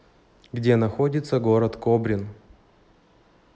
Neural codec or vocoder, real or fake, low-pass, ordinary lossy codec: none; real; none; none